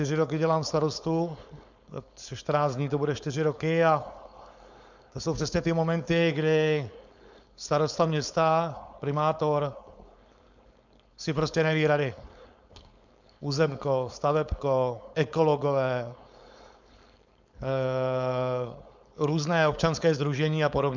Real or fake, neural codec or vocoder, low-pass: fake; codec, 16 kHz, 4.8 kbps, FACodec; 7.2 kHz